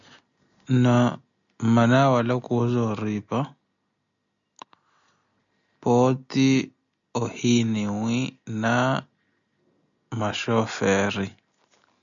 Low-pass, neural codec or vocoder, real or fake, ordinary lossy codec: 7.2 kHz; none; real; MP3, 96 kbps